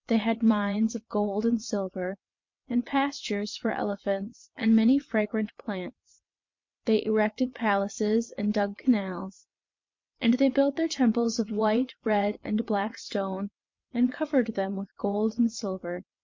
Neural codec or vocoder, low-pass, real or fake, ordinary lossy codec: vocoder, 22.05 kHz, 80 mel bands, WaveNeXt; 7.2 kHz; fake; MP3, 48 kbps